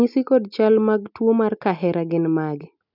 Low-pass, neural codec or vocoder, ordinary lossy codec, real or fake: 5.4 kHz; none; none; real